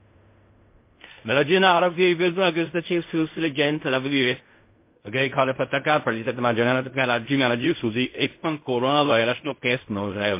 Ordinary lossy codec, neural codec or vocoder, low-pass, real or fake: MP3, 24 kbps; codec, 16 kHz in and 24 kHz out, 0.4 kbps, LongCat-Audio-Codec, fine tuned four codebook decoder; 3.6 kHz; fake